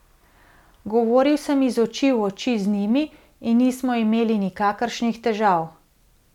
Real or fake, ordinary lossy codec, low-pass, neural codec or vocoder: real; none; 19.8 kHz; none